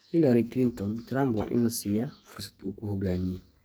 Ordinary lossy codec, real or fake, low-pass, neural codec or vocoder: none; fake; none; codec, 44.1 kHz, 2.6 kbps, SNAC